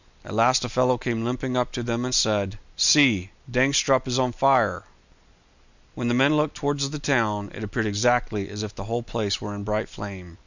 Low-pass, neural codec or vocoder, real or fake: 7.2 kHz; none; real